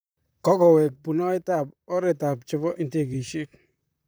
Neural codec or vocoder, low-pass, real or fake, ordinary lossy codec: vocoder, 44.1 kHz, 128 mel bands, Pupu-Vocoder; none; fake; none